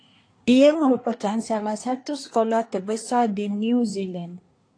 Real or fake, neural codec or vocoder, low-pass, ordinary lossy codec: fake; codec, 24 kHz, 1 kbps, SNAC; 9.9 kHz; AAC, 48 kbps